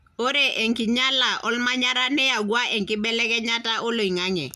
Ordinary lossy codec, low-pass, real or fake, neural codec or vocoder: none; 14.4 kHz; real; none